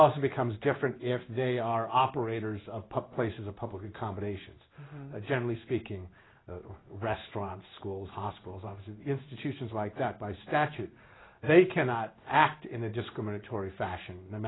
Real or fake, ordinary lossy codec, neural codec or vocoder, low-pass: fake; AAC, 16 kbps; codec, 16 kHz in and 24 kHz out, 1 kbps, XY-Tokenizer; 7.2 kHz